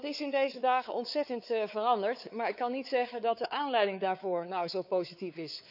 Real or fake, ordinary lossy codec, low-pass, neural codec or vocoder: fake; none; 5.4 kHz; codec, 16 kHz, 4 kbps, X-Codec, WavLM features, trained on Multilingual LibriSpeech